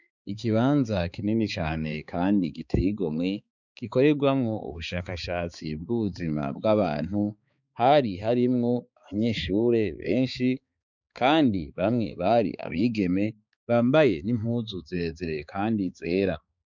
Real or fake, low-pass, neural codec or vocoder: fake; 7.2 kHz; codec, 16 kHz, 4 kbps, X-Codec, HuBERT features, trained on balanced general audio